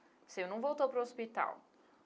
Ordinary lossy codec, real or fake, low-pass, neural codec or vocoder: none; real; none; none